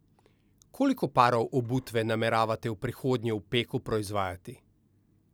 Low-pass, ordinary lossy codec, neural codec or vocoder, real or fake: none; none; none; real